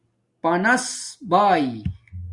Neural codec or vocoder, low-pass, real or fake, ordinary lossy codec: none; 10.8 kHz; real; Opus, 64 kbps